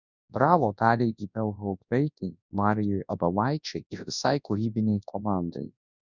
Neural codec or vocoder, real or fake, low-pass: codec, 24 kHz, 0.9 kbps, WavTokenizer, large speech release; fake; 7.2 kHz